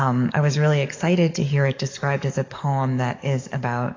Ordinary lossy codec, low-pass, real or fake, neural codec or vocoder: AAC, 32 kbps; 7.2 kHz; fake; codec, 44.1 kHz, 7.8 kbps, DAC